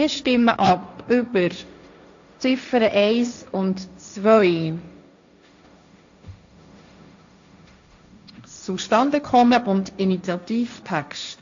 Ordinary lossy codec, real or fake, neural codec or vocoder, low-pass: AAC, 64 kbps; fake; codec, 16 kHz, 1.1 kbps, Voila-Tokenizer; 7.2 kHz